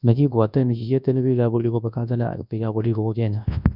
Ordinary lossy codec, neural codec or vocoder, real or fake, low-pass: none; codec, 24 kHz, 0.9 kbps, WavTokenizer, large speech release; fake; 5.4 kHz